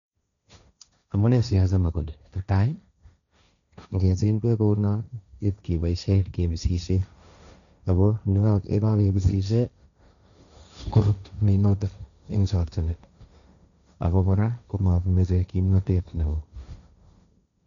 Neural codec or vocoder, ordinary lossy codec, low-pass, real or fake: codec, 16 kHz, 1.1 kbps, Voila-Tokenizer; none; 7.2 kHz; fake